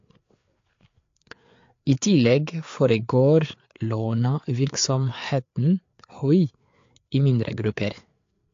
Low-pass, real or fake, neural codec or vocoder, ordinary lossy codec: 7.2 kHz; fake; codec, 16 kHz, 4 kbps, FreqCodec, larger model; AAC, 48 kbps